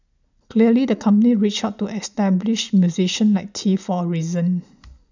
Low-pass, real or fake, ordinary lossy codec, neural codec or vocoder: 7.2 kHz; real; none; none